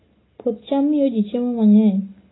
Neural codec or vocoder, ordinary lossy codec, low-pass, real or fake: none; AAC, 16 kbps; 7.2 kHz; real